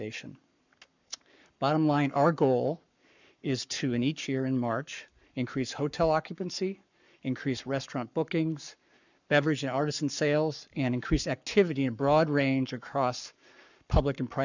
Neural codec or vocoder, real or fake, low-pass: codec, 44.1 kHz, 7.8 kbps, Pupu-Codec; fake; 7.2 kHz